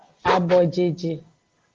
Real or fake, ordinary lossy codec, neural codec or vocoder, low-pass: real; Opus, 16 kbps; none; 7.2 kHz